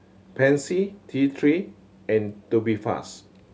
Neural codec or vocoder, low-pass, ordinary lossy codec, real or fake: none; none; none; real